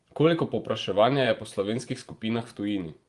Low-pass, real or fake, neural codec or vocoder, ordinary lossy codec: 10.8 kHz; fake; vocoder, 24 kHz, 100 mel bands, Vocos; Opus, 24 kbps